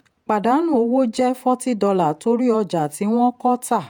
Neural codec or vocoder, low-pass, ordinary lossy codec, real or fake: vocoder, 48 kHz, 128 mel bands, Vocos; none; none; fake